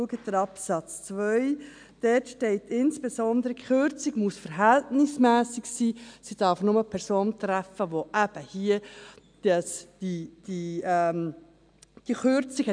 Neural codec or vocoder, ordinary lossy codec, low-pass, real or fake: none; MP3, 96 kbps; 9.9 kHz; real